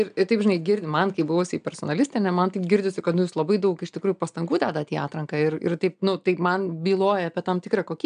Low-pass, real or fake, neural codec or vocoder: 9.9 kHz; real; none